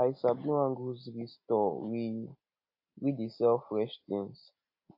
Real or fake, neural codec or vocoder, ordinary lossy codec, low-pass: real; none; AAC, 48 kbps; 5.4 kHz